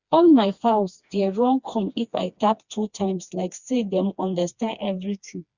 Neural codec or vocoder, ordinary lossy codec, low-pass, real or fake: codec, 16 kHz, 2 kbps, FreqCodec, smaller model; Opus, 64 kbps; 7.2 kHz; fake